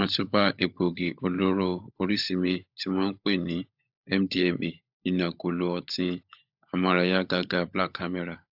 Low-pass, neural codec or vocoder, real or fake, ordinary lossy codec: 5.4 kHz; codec, 16 kHz, 16 kbps, FunCodec, trained on LibriTTS, 50 frames a second; fake; AAC, 48 kbps